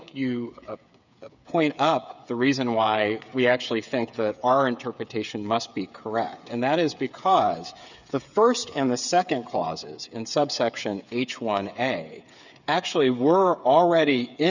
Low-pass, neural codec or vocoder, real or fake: 7.2 kHz; codec, 16 kHz, 8 kbps, FreqCodec, smaller model; fake